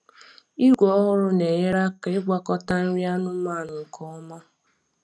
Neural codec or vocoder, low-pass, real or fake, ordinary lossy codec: none; none; real; none